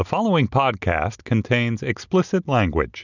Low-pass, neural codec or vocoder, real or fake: 7.2 kHz; none; real